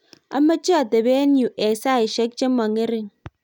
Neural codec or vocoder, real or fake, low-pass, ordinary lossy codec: none; real; 19.8 kHz; none